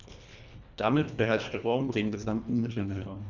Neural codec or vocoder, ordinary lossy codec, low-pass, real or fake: codec, 24 kHz, 1.5 kbps, HILCodec; none; 7.2 kHz; fake